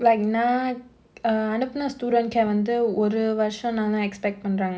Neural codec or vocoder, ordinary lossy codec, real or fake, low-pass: none; none; real; none